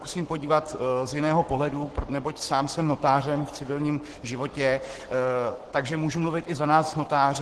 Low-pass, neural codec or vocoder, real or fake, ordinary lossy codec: 10.8 kHz; codec, 44.1 kHz, 7.8 kbps, Pupu-Codec; fake; Opus, 16 kbps